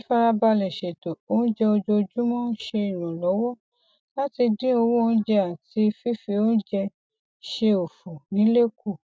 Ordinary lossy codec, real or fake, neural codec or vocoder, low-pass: none; real; none; none